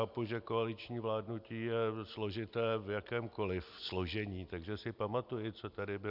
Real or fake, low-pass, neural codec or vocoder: real; 5.4 kHz; none